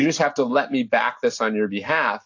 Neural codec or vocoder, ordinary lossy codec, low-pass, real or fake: none; AAC, 48 kbps; 7.2 kHz; real